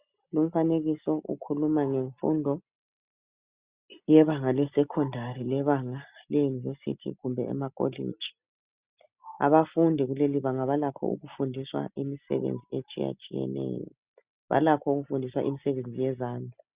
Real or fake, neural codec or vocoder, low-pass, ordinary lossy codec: real; none; 3.6 kHz; Opus, 64 kbps